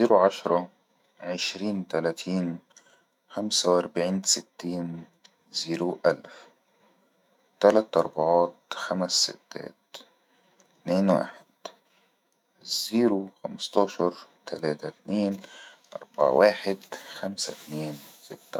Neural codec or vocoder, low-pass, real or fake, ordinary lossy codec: autoencoder, 48 kHz, 128 numbers a frame, DAC-VAE, trained on Japanese speech; 19.8 kHz; fake; none